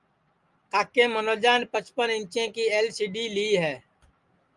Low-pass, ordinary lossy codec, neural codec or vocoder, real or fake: 10.8 kHz; Opus, 32 kbps; none; real